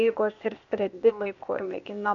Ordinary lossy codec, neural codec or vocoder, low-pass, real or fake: MP3, 96 kbps; codec, 16 kHz, 0.8 kbps, ZipCodec; 7.2 kHz; fake